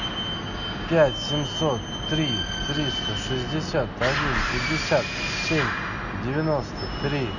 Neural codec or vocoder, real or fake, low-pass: none; real; 7.2 kHz